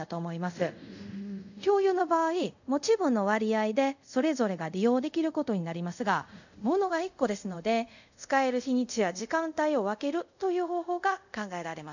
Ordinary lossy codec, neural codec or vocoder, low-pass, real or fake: none; codec, 24 kHz, 0.5 kbps, DualCodec; 7.2 kHz; fake